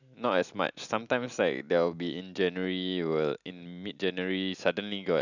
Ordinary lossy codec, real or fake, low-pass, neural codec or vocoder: none; real; 7.2 kHz; none